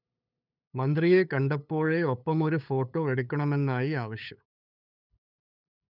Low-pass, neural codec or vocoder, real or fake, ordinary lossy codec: 5.4 kHz; codec, 16 kHz, 8 kbps, FunCodec, trained on LibriTTS, 25 frames a second; fake; none